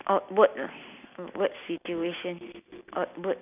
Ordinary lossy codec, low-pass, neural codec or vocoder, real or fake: none; 3.6 kHz; none; real